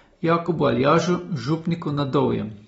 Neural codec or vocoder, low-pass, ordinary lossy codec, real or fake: none; 19.8 kHz; AAC, 24 kbps; real